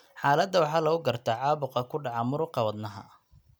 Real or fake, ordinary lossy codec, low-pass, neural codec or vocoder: real; none; none; none